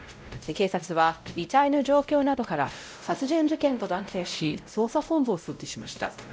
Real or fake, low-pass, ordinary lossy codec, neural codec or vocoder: fake; none; none; codec, 16 kHz, 0.5 kbps, X-Codec, WavLM features, trained on Multilingual LibriSpeech